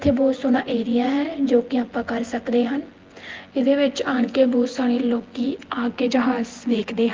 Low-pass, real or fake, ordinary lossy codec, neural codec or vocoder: 7.2 kHz; fake; Opus, 16 kbps; vocoder, 24 kHz, 100 mel bands, Vocos